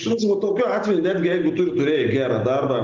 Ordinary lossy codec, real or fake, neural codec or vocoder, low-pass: Opus, 24 kbps; real; none; 7.2 kHz